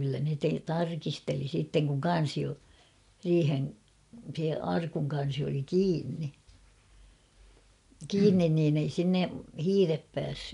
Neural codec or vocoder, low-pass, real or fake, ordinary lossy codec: none; 10.8 kHz; real; none